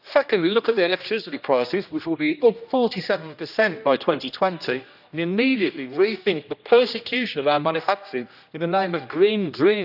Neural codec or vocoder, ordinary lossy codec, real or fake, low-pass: codec, 16 kHz, 1 kbps, X-Codec, HuBERT features, trained on general audio; none; fake; 5.4 kHz